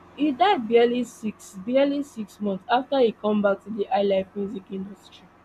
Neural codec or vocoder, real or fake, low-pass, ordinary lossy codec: none; real; 14.4 kHz; MP3, 96 kbps